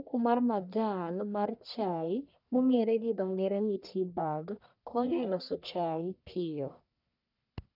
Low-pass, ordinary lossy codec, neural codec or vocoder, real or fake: 5.4 kHz; none; codec, 44.1 kHz, 1.7 kbps, Pupu-Codec; fake